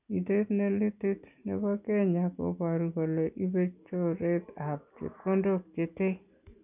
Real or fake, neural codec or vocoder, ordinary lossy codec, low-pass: real; none; none; 3.6 kHz